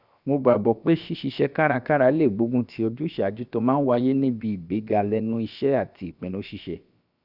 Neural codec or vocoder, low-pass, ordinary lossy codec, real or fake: codec, 16 kHz, about 1 kbps, DyCAST, with the encoder's durations; 5.4 kHz; none; fake